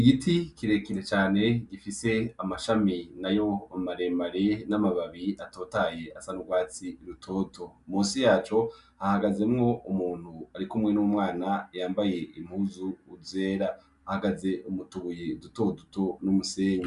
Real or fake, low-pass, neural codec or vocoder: real; 10.8 kHz; none